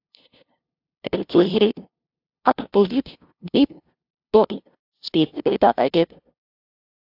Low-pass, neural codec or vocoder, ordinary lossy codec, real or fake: 5.4 kHz; codec, 16 kHz, 0.5 kbps, FunCodec, trained on LibriTTS, 25 frames a second; AAC, 48 kbps; fake